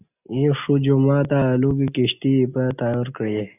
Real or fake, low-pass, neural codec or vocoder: real; 3.6 kHz; none